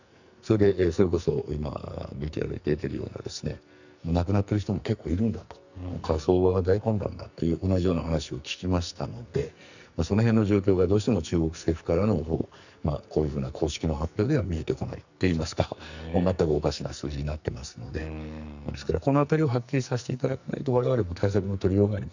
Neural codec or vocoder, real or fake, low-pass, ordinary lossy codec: codec, 44.1 kHz, 2.6 kbps, SNAC; fake; 7.2 kHz; none